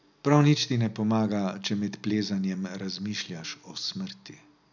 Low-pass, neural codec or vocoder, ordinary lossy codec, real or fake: 7.2 kHz; none; none; real